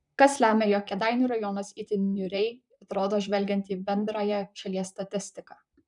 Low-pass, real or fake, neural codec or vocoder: 10.8 kHz; fake; vocoder, 44.1 kHz, 128 mel bands, Pupu-Vocoder